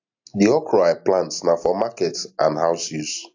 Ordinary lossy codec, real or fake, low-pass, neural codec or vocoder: AAC, 48 kbps; real; 7.2 kHz; none